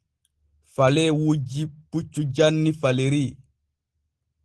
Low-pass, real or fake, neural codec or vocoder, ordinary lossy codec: 10.8 kHz; real; none; Opus, 16 kbps